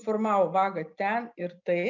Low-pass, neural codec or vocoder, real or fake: 7.2 kHz; none; real